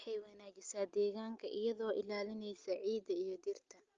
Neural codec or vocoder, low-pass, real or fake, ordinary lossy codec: none; 7.2 kHz; real; Opus, 24 kbps